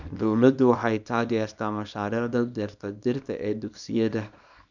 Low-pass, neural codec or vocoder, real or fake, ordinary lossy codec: 7.2 kHz; codec, 24 kHz, 0.9 kbps, WavTokenizer, small release; fake; none